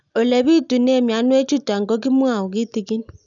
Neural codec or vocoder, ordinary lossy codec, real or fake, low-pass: none; none; real; 7.2 kHz